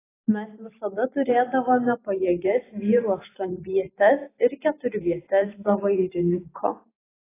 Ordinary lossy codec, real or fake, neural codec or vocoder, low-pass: AAC, 16 kbps; fake; vocoder, 44.1 kHz, 128 mel bands every 512 samples, BigVGAN v2; 3.6 kHz